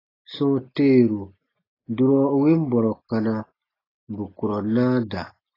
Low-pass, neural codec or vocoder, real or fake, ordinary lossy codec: 5.4 kHz; none; real; AAC, 24 kbps